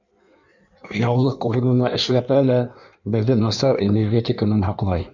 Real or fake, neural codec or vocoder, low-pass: fake; codec, 16 kHz in and 24 kHz out, 1.1 kbps, FireRedTTS-2 codec; 7.2 kHz